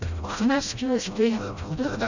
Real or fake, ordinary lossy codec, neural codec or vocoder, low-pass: fake; none; codec, 16 kHz, 0.5 kbps, FreqCodec, smaller model; 7.2 kHz